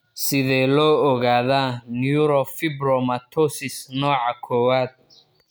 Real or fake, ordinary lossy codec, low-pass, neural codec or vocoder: real; none; none; none